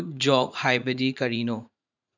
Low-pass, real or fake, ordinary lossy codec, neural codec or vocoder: 7.2 kHz; fake; none; vocoder, 44.1 kHz, 80 mel bands, Vocos